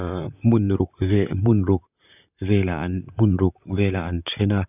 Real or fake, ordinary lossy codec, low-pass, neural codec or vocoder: fake; none; 3.6 kHz; vocoder, 44.1 kHz, 128 mel bands, Pupu-Vocoder